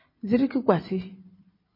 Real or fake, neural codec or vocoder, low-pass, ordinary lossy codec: fake; vocoder, 44.1 kHz, 128 mel bands every 512 samples, BigVGAN v2; 5.4 kHz; MP3, 24 kbps